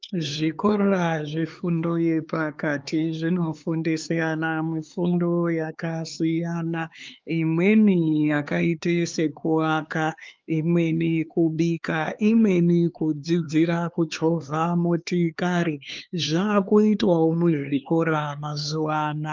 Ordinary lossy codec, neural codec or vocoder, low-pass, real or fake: Opus, 32 kbps; codec, 16 kHz, 4 kbps, X-Codec, HuBERT features, trained on LibriSpeech; 7.2 kHz; fake